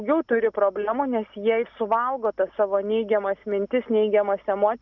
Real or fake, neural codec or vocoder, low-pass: real; none; 7.2 kHz